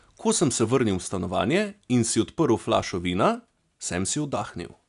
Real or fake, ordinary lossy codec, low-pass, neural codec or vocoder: real; none; 10.8 kHz; none